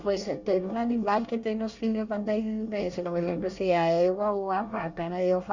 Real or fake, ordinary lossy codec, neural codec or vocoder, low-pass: fake; Opus, 64 kbps; codec, 24 kHz, 1 kbps, SNAC; 7.2 kHz